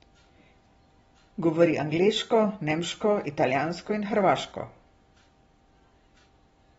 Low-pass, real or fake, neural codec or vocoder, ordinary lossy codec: 19.8 kHz; real; none; AAC, 24 kbps